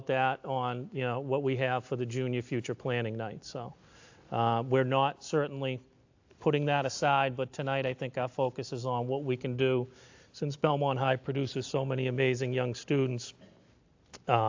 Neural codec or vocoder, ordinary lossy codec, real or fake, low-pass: none; AAC, 48 kbps; real; 7.2 kHz